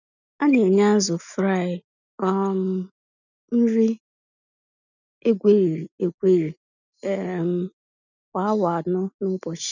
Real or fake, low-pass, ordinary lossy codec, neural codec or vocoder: real; 7.2 kHz; none; none